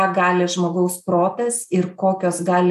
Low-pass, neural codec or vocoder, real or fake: 14.4 kHz; none; real